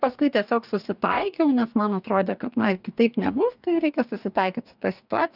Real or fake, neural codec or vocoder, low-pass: fake; codec, 44.1 kHz, 2.6 kbps, DAC; 5.4 kHz